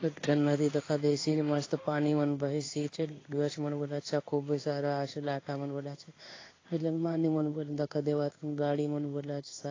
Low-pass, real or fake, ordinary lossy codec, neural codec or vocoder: 7.2 kHz; fake; AAC, 32 kbps; codec, 16 kHz in and 24 kHz out, 1 kbps, XY-Tokenizer